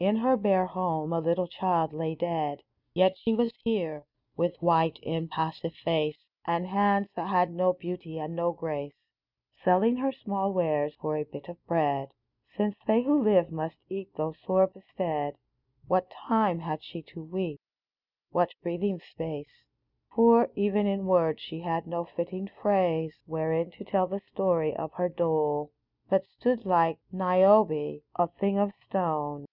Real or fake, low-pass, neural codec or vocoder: real; 5.4 kHz; none